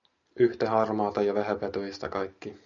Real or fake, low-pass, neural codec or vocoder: real; 7.2 kHz; none